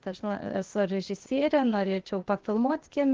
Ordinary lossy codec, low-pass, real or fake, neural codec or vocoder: Opus, 16 kbps; 7.2 kHz; fake; codec, 16 kHz, 0.8 kbps, ZipCodec